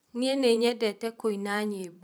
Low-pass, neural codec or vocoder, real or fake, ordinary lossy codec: none; vocoder, 44.1 kHz, 128 mel bands every 512 samples, BigVGAN v2; fake; none